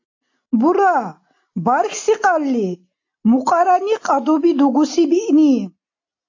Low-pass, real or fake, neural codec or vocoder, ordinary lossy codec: 7.2 kHz; real; none; AAC, 48 kbps